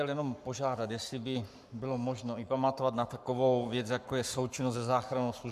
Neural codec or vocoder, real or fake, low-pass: codec, 44.1 kHz, 7.8 kbps, Pupu-Codec; fake; 14.4 kHz